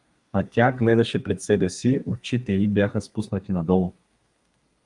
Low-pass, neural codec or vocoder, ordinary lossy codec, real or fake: 10.8 kHz; codec, 32 kHz, 1.9 kbps, SNAC; Opus, 32 kbps; fake